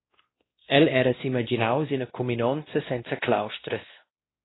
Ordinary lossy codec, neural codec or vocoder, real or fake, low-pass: AAC, 16 kbps; codec, 16 kHz, 1 kbps, X-Codec, WavLM features, trained on Multilingual LibriSpeech; fake; 7.2 kHz